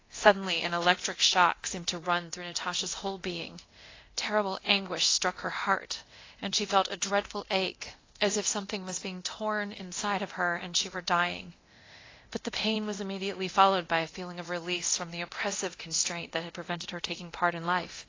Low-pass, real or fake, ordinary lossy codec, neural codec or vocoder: 7.2 kHz; fake; AAC, 32 kbps; codec, 24 kHz, 0.9 kbps, DualCodec